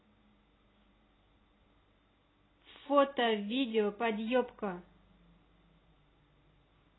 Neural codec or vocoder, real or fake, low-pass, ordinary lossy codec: none; real; 7.2 kHz; AAC, 16 kbps